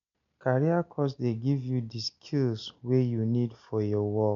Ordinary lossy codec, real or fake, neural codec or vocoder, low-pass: none; real; none; 7.2 kHz